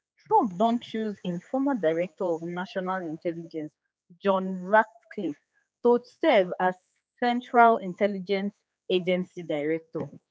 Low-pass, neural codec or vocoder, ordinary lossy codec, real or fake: none; codec, 16 kHz, 4 kbps, X-Codec, HuBERT features, trained on general audio; none; fake